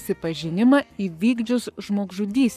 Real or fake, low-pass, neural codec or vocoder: fake; 14.4 kHz; codec, 44.1 kHz, 7.8 kbps, Pupu-Codec